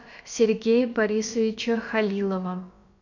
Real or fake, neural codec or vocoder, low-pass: fake; codec, 16 kHz, about 1 kbps, DyCAST, with the encoder's durations; 7.2 kHz